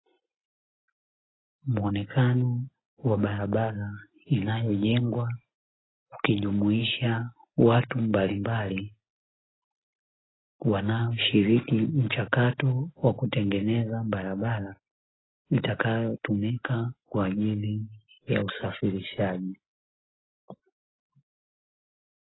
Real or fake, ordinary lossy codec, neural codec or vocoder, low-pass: real; AAC, 16 kbps; none; 7.2 kHz